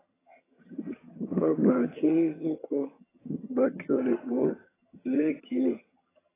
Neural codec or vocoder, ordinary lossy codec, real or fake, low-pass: vocoder, 22.05 kHz, 80 mel bands, HiFi-GAN; AAC, 16 kbps; fake; 3.6 kHz